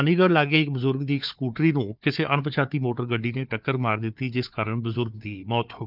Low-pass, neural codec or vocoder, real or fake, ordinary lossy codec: 5.4 kHz; codec, 16 kHz, 4 kbps, FunCodec, trained on Chinese and English, 50 frames a second; fake; none